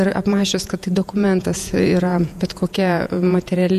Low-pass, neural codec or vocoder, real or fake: 14.4 kHz; vocoder, 48 kHz, 128 mel bands, Vocos; fake